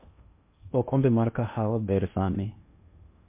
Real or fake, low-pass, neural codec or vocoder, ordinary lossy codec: fake; 3.6 kHz; codec, 16 kHz in and 24 kHz out, 0.8 kbps, FocalCodec, streaming, 65536 codes; MP3, 24 kbps